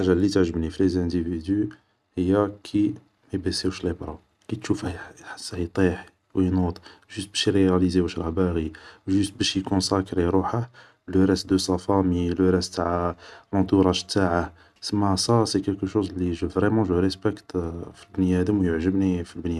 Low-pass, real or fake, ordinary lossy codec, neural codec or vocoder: none; real; none; none